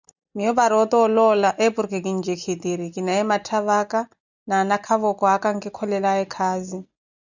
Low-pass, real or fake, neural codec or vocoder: 7.2 kHz; real; none